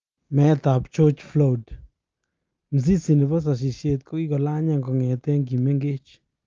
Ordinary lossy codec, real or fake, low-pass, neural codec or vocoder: Opus, 24 kbps; real; 7.2 kHz; none